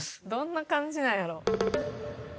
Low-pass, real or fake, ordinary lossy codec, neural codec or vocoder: none; real; none; none